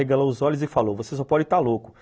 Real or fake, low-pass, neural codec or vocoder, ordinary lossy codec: real; none; none; none